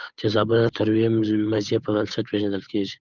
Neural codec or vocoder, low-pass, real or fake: codec, 16 kHz, 8 kbps, FunCodec, trained on Chinese and English, 25 frames a second; 7.2 kHz; fake